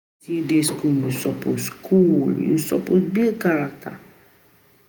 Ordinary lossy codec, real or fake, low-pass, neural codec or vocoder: none; fake; none; vocoder, 48 kHz, 128 mel bands, Vocos